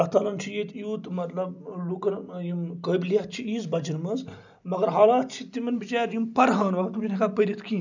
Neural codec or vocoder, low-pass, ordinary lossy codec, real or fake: none; 7.2 kHz; none; real